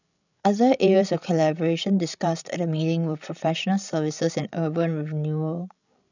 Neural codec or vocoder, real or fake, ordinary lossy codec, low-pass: codec, 16 kHz, 16 kbps, FreqCodec, larger model; fake; none; 7.2 kHz